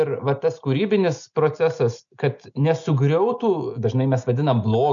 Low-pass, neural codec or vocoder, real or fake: 7.2 kHz; none; real